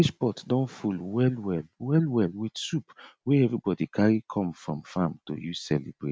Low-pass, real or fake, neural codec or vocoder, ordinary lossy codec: none; real; none; none